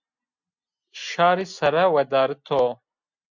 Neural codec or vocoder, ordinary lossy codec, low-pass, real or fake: none; MP3, 64 kbps; 7.2 kHz; real